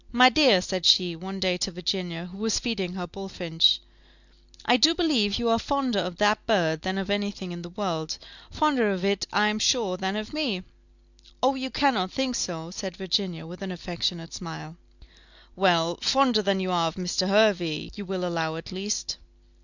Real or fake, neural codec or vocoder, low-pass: real; none; 7.2 kHz